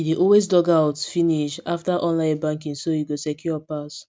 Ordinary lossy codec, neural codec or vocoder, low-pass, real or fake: none; none; none; real